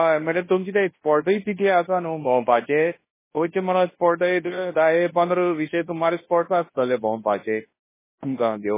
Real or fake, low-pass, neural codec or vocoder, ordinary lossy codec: fake; 3.6 kHz; codec, 24 kHz, 0.9 kbps, WavTokenizer, large speech release; MP3, 16 kbps